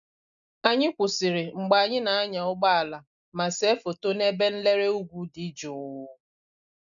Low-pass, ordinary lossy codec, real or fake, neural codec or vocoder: 7.2 kHz; none; real; none